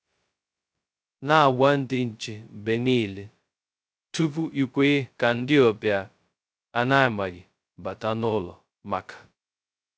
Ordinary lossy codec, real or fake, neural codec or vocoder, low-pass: none; fake; codec, 16 kHz, 0.2 kbps, FocalCodec; none